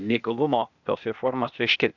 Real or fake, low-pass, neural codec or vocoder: fake; 7.2 kHz; codec, 16 kHz, 0.8 kbps, ZipCodec